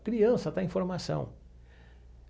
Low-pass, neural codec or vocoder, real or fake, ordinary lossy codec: none; none; real; none